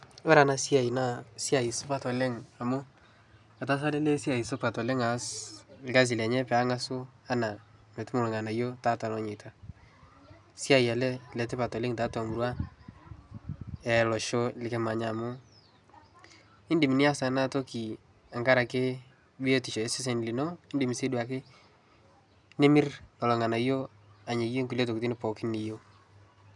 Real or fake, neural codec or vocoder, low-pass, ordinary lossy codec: real; none; 10.8 kHz; none